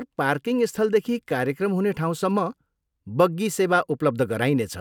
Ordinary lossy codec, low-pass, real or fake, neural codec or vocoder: none; 19.8 kHz; real; none